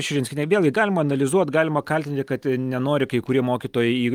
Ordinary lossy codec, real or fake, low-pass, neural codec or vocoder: Opus, 32 kbps; real; 19.8 kHz; none